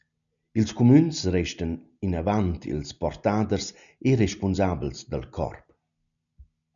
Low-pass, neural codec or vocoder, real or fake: 7.2 kHz; none; real